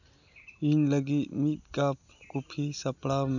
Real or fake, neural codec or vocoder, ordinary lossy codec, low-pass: real; none; none; 7.2 kHz